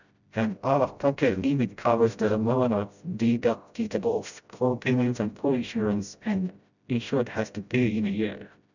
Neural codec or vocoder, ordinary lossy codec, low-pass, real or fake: codec, 16 kHz, 0.5 kbps, FreqCodec, smaller model; none; 7.2 kHz; fake